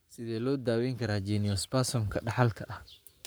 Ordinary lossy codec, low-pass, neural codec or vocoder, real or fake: none; none; codec, 44.1 kHz, 7.8 kbps, Pupu-Codec; fake